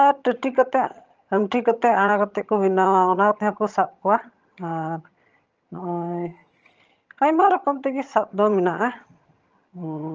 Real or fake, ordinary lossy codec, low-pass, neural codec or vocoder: fake; Opus, 24 kbps; 7.2 kHz; vocoder, 22.05 kHz, 80 mel bands, HiFi-GAN